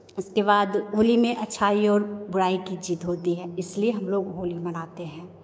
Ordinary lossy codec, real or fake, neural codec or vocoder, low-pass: none; fake; codec, 16 kHz, 6 kbps, DAC; none